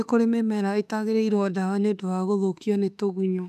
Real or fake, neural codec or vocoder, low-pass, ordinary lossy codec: fake; autoencoder, 48 kHz, 32 numbers a frame, DAC-VAE, trained on Japanese speech; 14.4 kHz; none